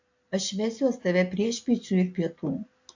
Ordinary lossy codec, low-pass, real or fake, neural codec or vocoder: AAC, 48 kbps; 7.2 kHz; real; none